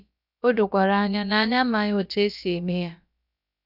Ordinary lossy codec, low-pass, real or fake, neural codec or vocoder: none; 5.4 kHz; fake; codec, 16 kHz, about 1 kbps, DyCAST, with the encoder's durations